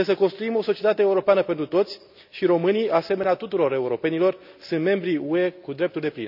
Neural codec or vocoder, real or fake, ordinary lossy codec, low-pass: none; real; none; 5.4 kHz